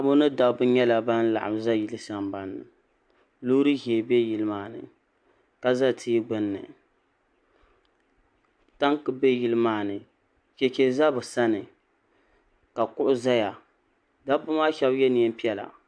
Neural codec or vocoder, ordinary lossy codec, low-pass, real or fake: none; AAC, 64 kbps; 9.9 kHz; real